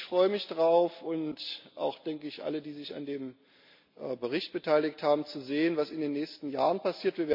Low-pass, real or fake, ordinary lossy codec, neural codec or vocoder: 5.4 kHz; real; MP3, 48 kbps; none